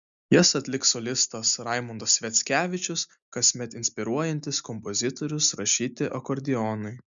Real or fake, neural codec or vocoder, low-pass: real; none; 7.2 kHz